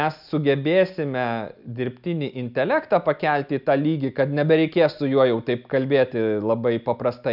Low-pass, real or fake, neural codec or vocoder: 5.4 kHz; real; none